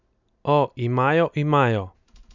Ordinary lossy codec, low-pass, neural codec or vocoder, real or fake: none; 7.2 kHz; none; real